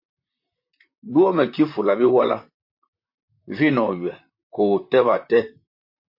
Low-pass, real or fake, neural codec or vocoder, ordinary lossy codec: 5.4 kHz; fake; vocoder, 44.1 kHz, 128 mel bands, Pupu-Vocoder; MP3, 32 kbps